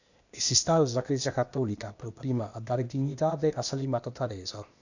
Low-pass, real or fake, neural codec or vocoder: 7.2 kHz; fake; codec, 16 kHz, 0.8 kbps, ZipCodec